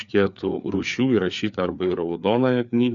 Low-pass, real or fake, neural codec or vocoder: 7.2 kHz; fake; codec, 16 kHz, 4 kbps, FreqCodec, larger model